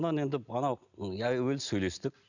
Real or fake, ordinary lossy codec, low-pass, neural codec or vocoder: real; none; 7.2 kHz; none